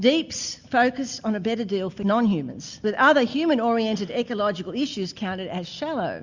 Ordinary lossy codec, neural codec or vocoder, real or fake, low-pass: Opus, 64 kbps; none; real; 7.2 kHz